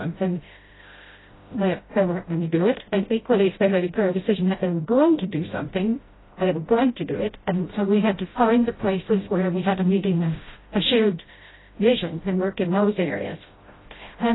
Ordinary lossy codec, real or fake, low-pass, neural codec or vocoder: AAC, 16 kbps; fake; 7.2 kHz; codec, 16 kHz, 0.5 kbps, FreqCodec, smaller model